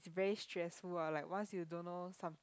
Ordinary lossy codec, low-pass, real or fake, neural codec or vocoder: none; none; real; none